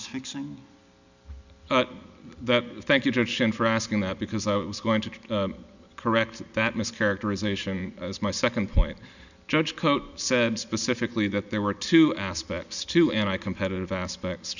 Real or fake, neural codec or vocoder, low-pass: real; none; 7.2 kHz